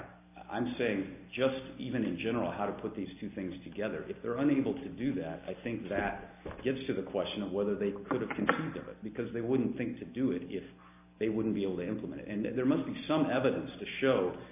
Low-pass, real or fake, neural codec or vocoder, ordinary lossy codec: 3.6 kHz; real; none; AAC, 32 kbps